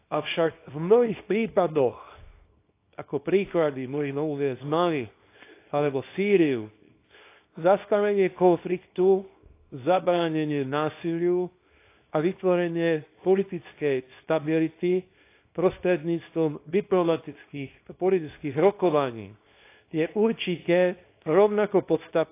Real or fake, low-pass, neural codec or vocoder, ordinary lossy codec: fake; 3.6 kHz; codec, 24 kHz, 0.9 kbps, WavTokenizer, small release; AAC, 24 kbps